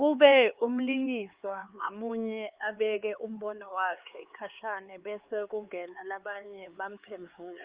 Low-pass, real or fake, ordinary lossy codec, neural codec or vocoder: 3.6 kHz; fake; Opus, 32 kbps; codec, 16 kHz, 4 kbps, X-Codec, HuBERT features, trained on LibriSpeech